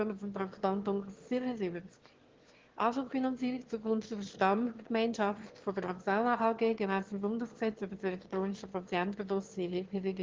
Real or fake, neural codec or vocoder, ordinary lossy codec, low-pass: fake; autoencoder, 22.05 kHz, a latent of 192 numbers a frame, VITS, trained on one speaker; Opus, 16 kbps; 7.2 kHz